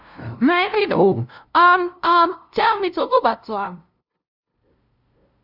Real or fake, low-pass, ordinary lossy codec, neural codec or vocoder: fake; 5.4 kHz; none; codec, 16 kHz, 0.5 kbps, FunCodec, trained on LibriTTS, 25 frames a second